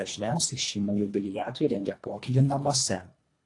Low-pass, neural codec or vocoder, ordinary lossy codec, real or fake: 10.8 kHz; codec, 24 kHz, 1.5 kbps, HILCodec; AAC, 48 kbps; fake